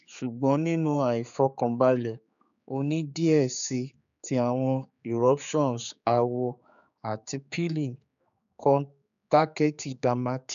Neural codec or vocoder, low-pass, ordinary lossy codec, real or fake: codec, 16 kHz, 4 kbps, X-Codec, HuBERT features, trained on general audio; 7.2 kHz; none; fake